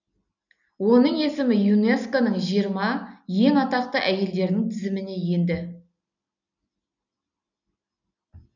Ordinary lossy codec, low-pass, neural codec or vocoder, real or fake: none; 7.2 kHz; none; real